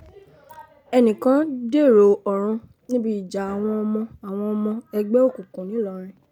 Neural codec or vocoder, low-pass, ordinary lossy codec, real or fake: none; 19.8 kHz; none; real